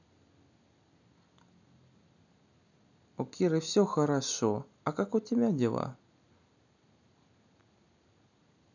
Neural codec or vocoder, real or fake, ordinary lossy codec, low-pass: none; real; none; 7.2 kHz